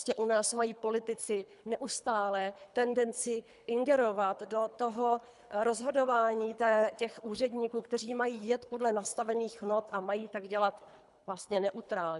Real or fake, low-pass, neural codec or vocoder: fake; 10.8 kHz; codec, 24 kHz, 3 kbps, HILCodec